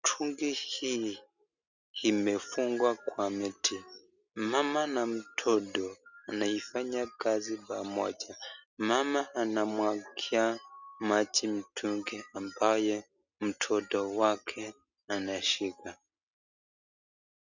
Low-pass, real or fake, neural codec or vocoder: 7.2 kHz; real; none